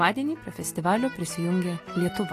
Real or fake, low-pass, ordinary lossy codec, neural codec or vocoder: real; 14.4 kHz; AAC, 48 kbps; none